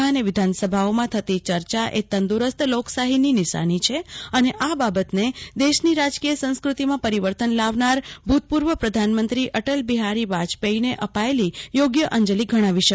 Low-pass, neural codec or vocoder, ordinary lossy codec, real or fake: none; none; none; real